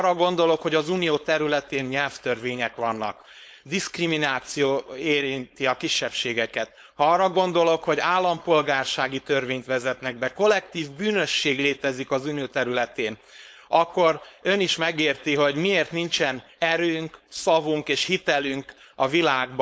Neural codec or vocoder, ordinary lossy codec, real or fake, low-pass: codec, 16 kHz, 4.8 kbps, FACodec; none; fake; none